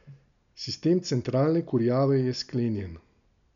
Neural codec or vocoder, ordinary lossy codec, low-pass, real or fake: none; none; 7.2 kHz; real